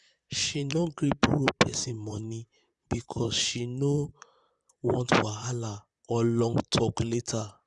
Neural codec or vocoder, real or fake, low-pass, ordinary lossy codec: vocoder, 44.1 kHz, 128 mel bands, Pupu-Vocoder; fake; 10.8 kHz; Opus, 64 kbps